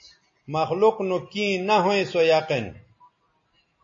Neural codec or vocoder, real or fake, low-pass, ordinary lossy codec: none; real; 7.2 kHz; MP3, 32 kbps